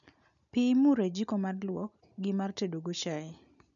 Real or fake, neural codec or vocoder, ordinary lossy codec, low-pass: real; none; none; 7.2 kHz